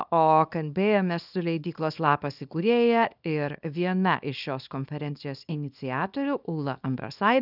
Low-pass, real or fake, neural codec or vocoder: 5.4 kHz; fake; codec, 24 kHz, 0.9 kbps, WavTokenizer, small release